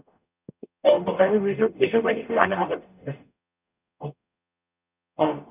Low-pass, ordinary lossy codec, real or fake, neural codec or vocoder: 3.6 kHz; none; fake; codec, 44.1 kHz, 0.9 kbps, DAC